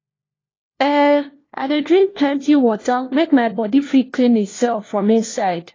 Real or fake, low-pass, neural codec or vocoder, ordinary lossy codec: fake; 7.2 kHz; codec, 16 kHz, 1 kbps, FunCodec, trained on LibriTTS, 50 frames a second; AAC, 32 kbps